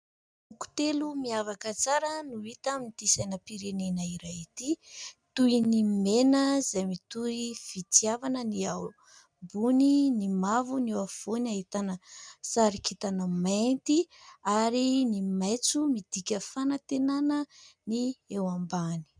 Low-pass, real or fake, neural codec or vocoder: 9.9 kHz; real; none